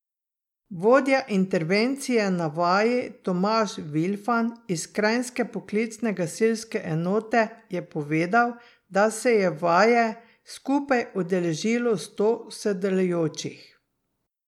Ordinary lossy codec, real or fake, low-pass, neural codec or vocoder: MP3, 96 kbps; real; 19.8 kHz; none